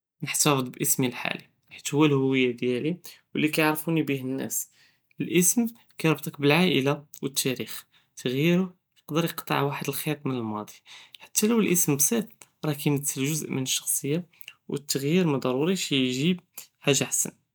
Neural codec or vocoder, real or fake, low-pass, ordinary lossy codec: none; real; none; none